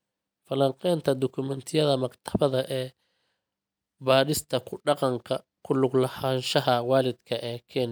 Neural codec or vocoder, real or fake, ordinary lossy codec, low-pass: none; real; none; none